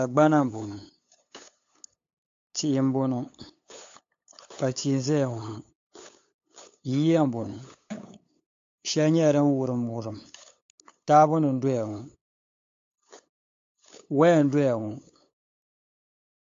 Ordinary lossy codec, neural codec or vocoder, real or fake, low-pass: AAC, 48 kbps; codec, 16 kHz, 8 kbps, FunCodec, trained on LibriTTS, 25 frames a second; fake; 7.2 kHz